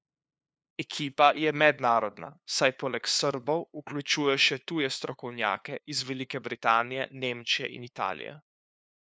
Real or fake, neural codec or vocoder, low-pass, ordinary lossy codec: fake; codec, 16 kHz, 2 kbps, FunCodec, trained on LibriTTS, 25 frames a second; none; none